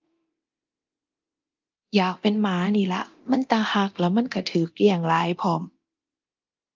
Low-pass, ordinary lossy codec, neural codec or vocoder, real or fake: 7.2 kHz; Opus, 24 kbps; codec, 24 kHz, 0.9 kbps, DualCodec; fake